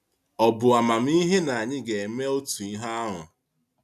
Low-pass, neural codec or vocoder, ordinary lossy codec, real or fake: 14.4 kHz; none; none; real